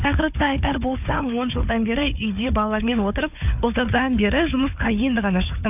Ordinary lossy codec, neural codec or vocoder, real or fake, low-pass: none; codec, 16 kHz, 4 kbps, FunCodec, trained on Chinese and English, 50 frames a second; fake; 3.6 kHz